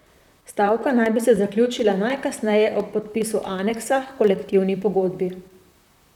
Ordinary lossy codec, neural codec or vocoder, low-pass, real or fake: none; vocoder, 44.1 kHz, 128 mel bands, Pupu-Vocoder; 19.8 kHz; fake